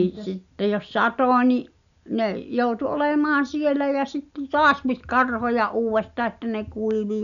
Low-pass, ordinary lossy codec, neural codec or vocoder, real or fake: 7.2 kHz; none; none; real